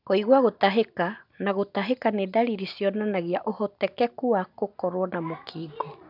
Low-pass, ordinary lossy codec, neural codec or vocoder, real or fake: 5.4 kHz; none; none; real